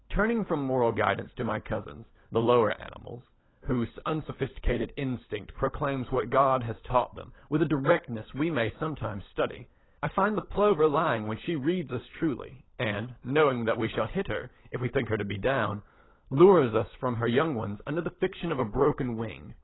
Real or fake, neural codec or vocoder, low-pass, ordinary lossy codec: fake; codec, 16 kHz, 16 kbps, FunCodec, trained on LibriTTS, 50 frames a second; 7.2 kHz; AAC, 16 kbps